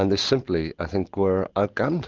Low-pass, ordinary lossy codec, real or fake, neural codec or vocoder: 7.2 kHz; Opus, 32 kbps; real; none